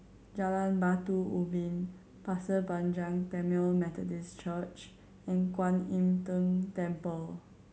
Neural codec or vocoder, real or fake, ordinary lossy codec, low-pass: none; real; none; none